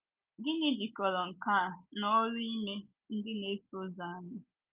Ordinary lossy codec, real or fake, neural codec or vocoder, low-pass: Opus, 24 kbps; real; none; 3.6 kHz